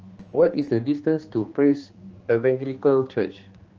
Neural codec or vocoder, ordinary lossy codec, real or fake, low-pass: codec, 16 kHz, 2 kbps, X-Codec, HuBERT features, trained on general audio; Opus, 24 kbps; fake; 7.2 kHz